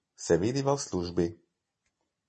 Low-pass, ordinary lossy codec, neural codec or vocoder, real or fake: 10.8 kHz; MP3, 32 kbps; none; real